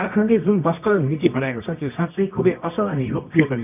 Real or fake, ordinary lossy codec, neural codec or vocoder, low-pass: fake; none; codec, 24 kHz, 0.9 kbps, WavTokenizer, medium music audio release; 3.6 kHz